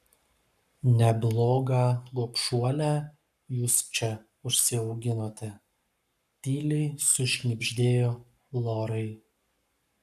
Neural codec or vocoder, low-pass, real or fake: codec, 44.1 kHz, 7.8 kbps, Pupu-Codec; 14.4 kHz; fake